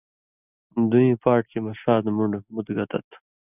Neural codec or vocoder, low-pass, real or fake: none; 3.6 kHz; real